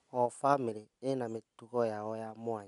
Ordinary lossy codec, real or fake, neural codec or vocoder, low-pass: none; real; none; 10.8 kHz